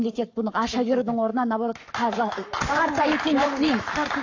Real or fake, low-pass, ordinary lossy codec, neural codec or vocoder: fake; 7.2 kHz; AAC, 48 kbps; codec, 44.1 kHz, 7.8 kbps, Pupu-Codec